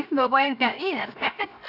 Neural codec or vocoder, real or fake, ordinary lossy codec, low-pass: codec, 16 kHz, 0.7 kbps, FocalCodec; fake; none; 5.4 kHz